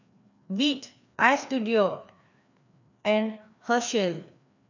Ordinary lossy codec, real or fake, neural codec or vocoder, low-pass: none; fake; codec, 16 kHz, 2 kbps, FreqCodec, larger model; 7.2 kHz